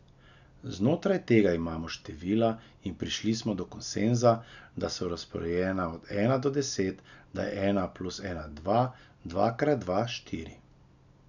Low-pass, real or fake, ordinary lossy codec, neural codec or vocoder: 7.2 kHz; real; none; none